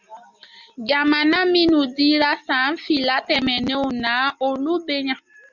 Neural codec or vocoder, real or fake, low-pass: none; real; 7.2 kHz